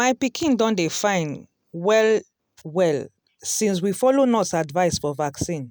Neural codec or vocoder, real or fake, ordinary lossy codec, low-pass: none; real; none; none